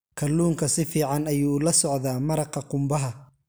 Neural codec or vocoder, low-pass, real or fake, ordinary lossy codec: none; none; real; none